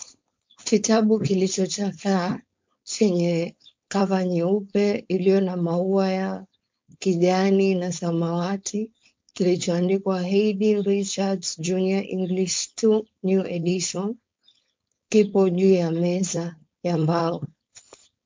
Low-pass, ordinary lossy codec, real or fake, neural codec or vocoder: 7.2 kHz; MP3, 48 kbps; fake; codec, 16 kHz, 4.8 kbps, FACodec